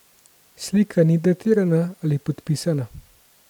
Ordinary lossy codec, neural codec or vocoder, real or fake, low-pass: none; vocoder, 44.1 kHz, 128 mel bands every 256 samples, BigVGAN v2; fake; 19.8 kHz